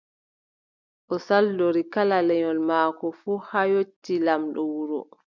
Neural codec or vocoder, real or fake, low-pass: none; real; 7.2 kHz